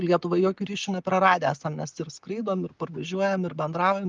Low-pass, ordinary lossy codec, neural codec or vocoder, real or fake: 7.2 kHz; Opus, 32 kbps; none; real